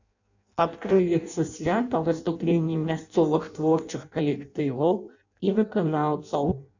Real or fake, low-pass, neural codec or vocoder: fake; 7.2 kHz; codec, 16 kHz in and 24 kHz out, 0.6 kbps, FireRedTTS-2 codec